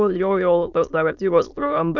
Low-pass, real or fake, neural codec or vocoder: 7.2 kHz; fake; autoencoder, 22.05 kHz, a latent of 192 numbers a frame, VITS, trained on many speakers